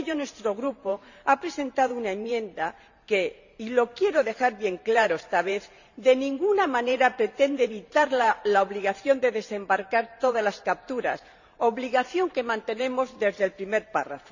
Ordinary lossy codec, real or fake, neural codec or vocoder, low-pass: none; fake; vocoder, 44.1 kHz, 128 mel bands every 512 samples, BigVGAN v2; 7.2 kHz